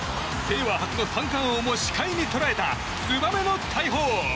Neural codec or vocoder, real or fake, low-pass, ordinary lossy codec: none; real; none; none